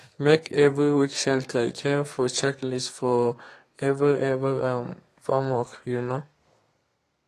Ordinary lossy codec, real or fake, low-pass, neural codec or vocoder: AAC, 48 kbps; fake; 14.4 kHz; codec, 32 kHz, 1.9 kbps, SNAC